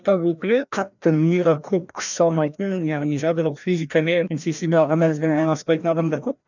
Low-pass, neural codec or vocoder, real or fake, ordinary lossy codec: 7.2 kHz; codec, 16 kHz, 1 kbps, FreqCodec, larger model; fake; none